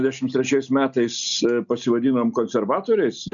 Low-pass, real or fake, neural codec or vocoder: 7.2 kHz; real; none